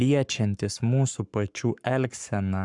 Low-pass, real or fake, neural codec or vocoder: 10.8 kHz; fake; codec, 44.1 kHz, 7.8 kbps, Pupu-Codec